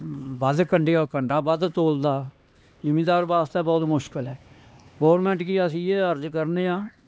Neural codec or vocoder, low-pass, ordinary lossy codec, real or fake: codec, 16 kHz, 2 kbps, X-Codec, HuBERT features, trained on LibriSpeech; none; none; fake